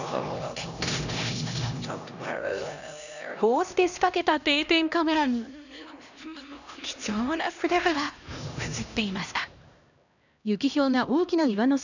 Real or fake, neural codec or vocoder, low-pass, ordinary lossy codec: fake; codec, 16 kHz, 1 kbps, X-Codec, HuBERT features, trained on LibriSpeech; 7.2 kHz; none